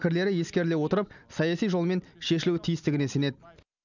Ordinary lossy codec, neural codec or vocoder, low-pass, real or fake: none; none; 7.2 kHz; real